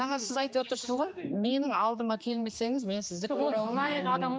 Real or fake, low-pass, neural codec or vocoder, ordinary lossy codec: fake; none; codec, 16 kHz, 2 kbps, X-Codec, HuBERT features, trained on general audio; none